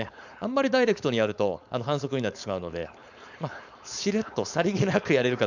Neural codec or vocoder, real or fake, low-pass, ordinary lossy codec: codec, 16 kHz, 4.8 kbps, FACodec; fake; 7.2 kHz; none